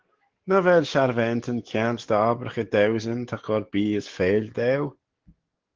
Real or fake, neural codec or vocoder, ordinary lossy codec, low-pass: fake; codec, 44.1 kHz, 7.8 kbps, DAC; Opus, 16 kbps; 7.2 kHz